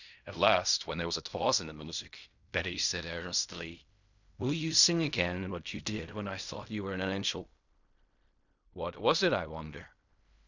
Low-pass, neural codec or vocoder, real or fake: 7.2 kHz; codec, 16 kHz in and 24 kHz out, 0.4 kbps, LongCat-Audio-Codec, fine tuned four codebook decoder; fake